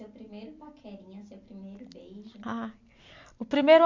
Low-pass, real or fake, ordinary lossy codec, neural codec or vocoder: 7.2 kHz; real; MP3, 48 kbps; none